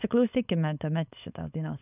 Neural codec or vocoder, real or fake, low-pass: codec, 16 kHz, 4.8 kbps, FACodec; fake; 3.6 kHz